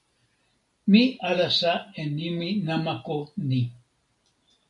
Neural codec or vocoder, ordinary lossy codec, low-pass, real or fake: none; AAC, 64 kbps; 10.8 kHz; real